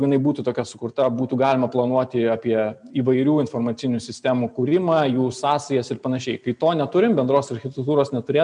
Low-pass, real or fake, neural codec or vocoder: 9.9 kHz; real; none